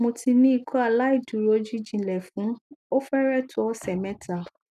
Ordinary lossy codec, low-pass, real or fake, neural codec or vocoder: none; 14.4 kHz; real; none